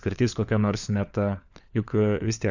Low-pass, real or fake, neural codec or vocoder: 7.2 kHz; fake; codec, 16 kHz, 4 kbps, FunCodec, trained on LibriTTS, 50 frames a second